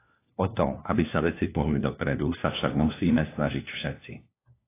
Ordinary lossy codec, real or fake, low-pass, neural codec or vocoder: AAC, 24 kbps; fake; 3.6 kHz; codec, 16 kHz, 1 kbps, FunCodec, trained on LibriTTS, 50 frames a second